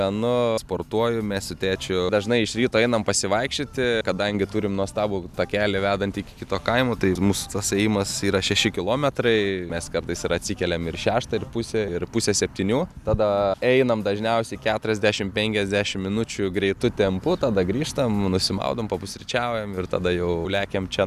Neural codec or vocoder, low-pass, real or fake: none; 14.4 kHz; real